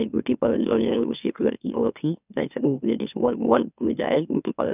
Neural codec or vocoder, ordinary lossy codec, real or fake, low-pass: autoencoder, 44.1 kHz, a latent of 192 numbers a frame, MeloTTS; none; fake; 3.6 kHz